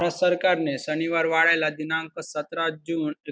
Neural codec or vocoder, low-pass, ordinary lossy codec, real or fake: none; none; none; real